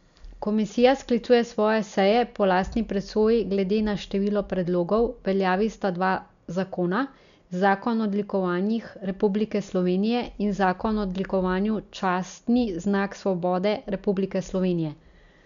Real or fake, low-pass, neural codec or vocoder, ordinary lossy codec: real; 7.2 kHz; none; MP3, 96 kbps